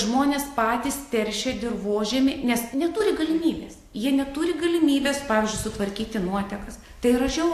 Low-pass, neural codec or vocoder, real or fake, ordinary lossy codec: 14.4 kHz; none; real; AAC, 64 kbps